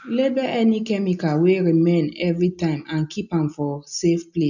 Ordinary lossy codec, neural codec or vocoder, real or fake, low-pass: none; none; real; 7.2 kHz